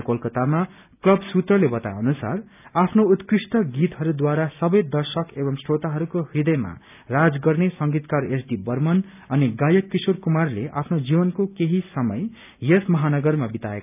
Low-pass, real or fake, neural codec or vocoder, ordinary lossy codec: 3.6 kHz; real; none; none